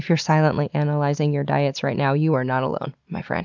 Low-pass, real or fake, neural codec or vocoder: 7.2 kHz; real; none